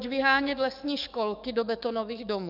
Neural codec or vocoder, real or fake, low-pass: codec, 44.1 kHz, 7.8 kbps, DAC; fake; 5.4 kHz